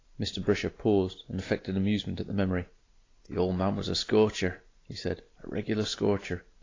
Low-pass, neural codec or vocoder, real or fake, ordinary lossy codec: 7.2 kHz; none; real; AAC, 32 kbps